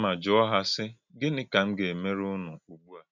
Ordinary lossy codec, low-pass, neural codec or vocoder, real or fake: none; 7.2 kHz; none; real